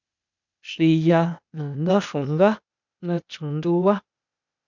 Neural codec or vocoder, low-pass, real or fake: codec, 16 kHz, 0.8 kbps, ZipCodec; 7.2 kHz; fake